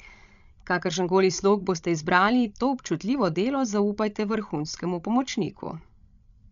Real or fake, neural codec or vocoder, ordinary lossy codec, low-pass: fake; codec, 16 kHz, 16 kbps, FreqCodec, larger model; none; 7.2 kHz